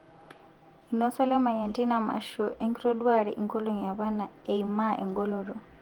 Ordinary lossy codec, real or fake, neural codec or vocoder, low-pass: Opus, 24 kbps; fake; vocoder, 48 kHz, 128 mel bands, Vocos; 19.8 kHz